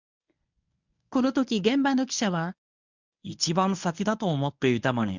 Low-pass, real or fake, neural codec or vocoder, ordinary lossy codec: 7.2 kHz; fake; codec, 24 kHz, 0.9 kbps, WavTokenizer, medium speech release version 1; none